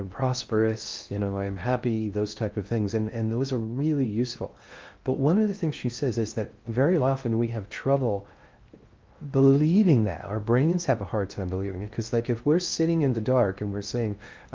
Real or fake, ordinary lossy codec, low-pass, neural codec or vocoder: fake; Opus, 32 kbps; 7.2 kHz; codec, 16 kHz in and 24 kHz out, 0.6 kbps, FocalCodec, streaming, 2048 codes